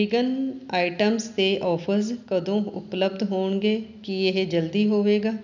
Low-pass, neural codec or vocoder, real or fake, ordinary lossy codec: 7.2 kHz; none; real; none